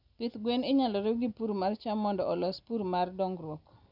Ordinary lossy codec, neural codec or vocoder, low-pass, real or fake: none; none; 5.4 kHz; real